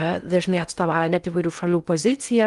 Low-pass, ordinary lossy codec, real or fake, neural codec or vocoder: 10.8 kHz; Opus, 32 kbps; fake; codec, 16 kHz in and 24 kHz out, 0.6 kbps, FocalCodec, streaming, 2048 codes